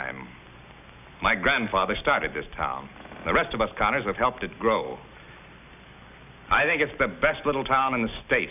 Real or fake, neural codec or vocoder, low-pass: real; none; 3.6 kHz